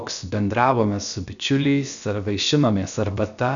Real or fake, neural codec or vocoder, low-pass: fake; codec, 16 kHz, about 1 kbps, DyCAST, with the encoder's durations; 7.2 kHz